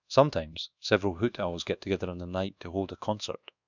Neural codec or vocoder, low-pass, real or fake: codec, 24 kHz, 1.2 kbps, DualCodec; 7.2 kHz; fake